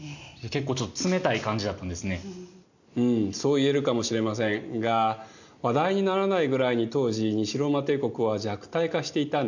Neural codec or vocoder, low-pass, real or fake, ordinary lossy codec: none; 7.2 kHz; real; none